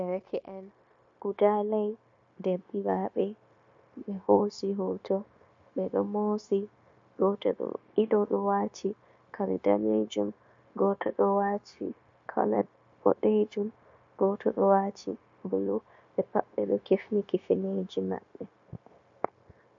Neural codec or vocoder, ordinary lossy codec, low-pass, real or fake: codec, 16 kHz, 0.9 kbps, LongCat-Audio-Codec; MP3, 48 kbps; 7.2 kHz; fake